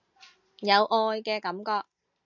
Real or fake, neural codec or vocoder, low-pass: real; none; 7.2 kHz